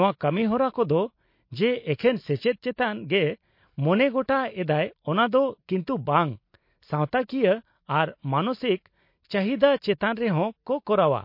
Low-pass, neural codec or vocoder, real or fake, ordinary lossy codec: 5.4 kHz; none; real; MP3, 32 kbps